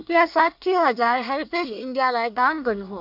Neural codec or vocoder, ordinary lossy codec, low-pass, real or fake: codec, 24 kHz, 1 kbps, SNAC; none; 5.4 kHz; fake